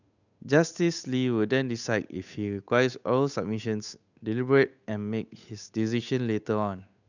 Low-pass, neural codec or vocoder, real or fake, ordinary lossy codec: 7.2 kHz; codec, 16 kHz, 8 kbps, FunCodec, trained on Chinese and English, 25 frames a second; fake; none